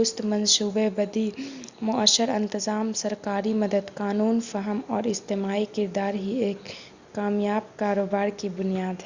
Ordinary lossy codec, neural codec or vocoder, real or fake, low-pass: Opus, 64 kbps; none; real; 7.2 kHz